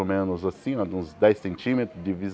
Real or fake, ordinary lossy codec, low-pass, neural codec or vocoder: real; none; none; none